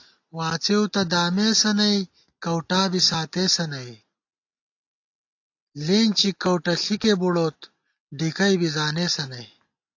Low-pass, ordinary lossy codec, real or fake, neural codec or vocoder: 7.2 kHz; AAC, 48 kbps; real; none